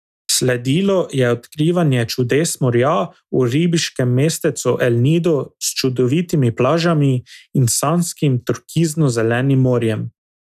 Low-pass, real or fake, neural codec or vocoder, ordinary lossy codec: 14.4 kHz; real; none; none